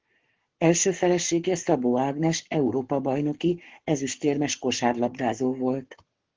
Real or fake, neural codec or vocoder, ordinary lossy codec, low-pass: fake; codec, 44.1 kHz, 7.8 kbps, Pupu-Codec; Opus, 16 kbps; 7.2 kHz